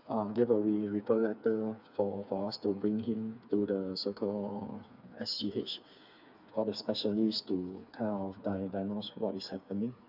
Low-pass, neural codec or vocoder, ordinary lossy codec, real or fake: 5.4 kHz; codec, 16 kHz, 4 kbps, FreqCodec, smaller model; none; fake